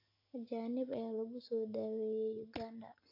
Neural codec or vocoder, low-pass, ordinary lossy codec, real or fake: none; 5.4 kHz; AAC, 32 kbps; real